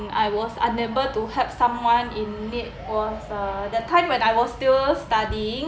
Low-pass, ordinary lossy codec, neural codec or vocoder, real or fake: none; none; none; real